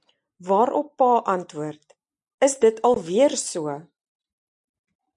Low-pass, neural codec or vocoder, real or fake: 10.8 kHz; none; real